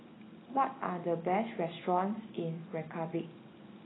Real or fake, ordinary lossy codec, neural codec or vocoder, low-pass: real; AAC, 16 kbps; none; 7.2 kHz